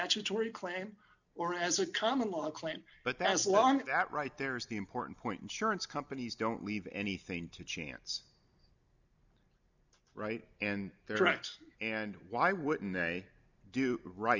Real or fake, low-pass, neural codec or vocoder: real; 7.2 kHz; none